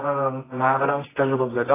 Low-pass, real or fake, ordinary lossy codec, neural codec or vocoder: 3.6 kHz; fake; AAC, 16 kbps; codec, 24 kHz, 0.9 kbps, WavTokenizer, medium music audio release